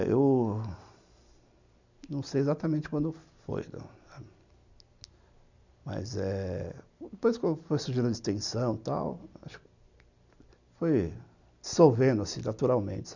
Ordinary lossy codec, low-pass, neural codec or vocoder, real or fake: none; 7.2 kHz; none; real